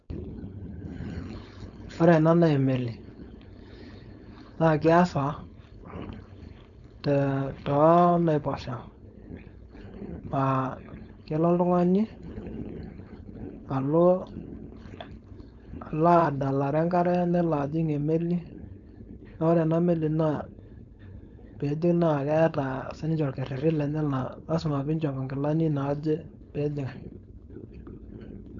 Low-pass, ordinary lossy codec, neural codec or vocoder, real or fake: 7.2 kHz; none; codec, 16 kHz, 4.8 kbps, FACodec; fake